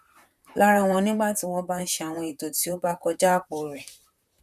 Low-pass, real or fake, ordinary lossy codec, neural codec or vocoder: 14.4 kHz; fake; none; vocoder, 44.1 kHz, 128 mel bands, Pupu-Vocoder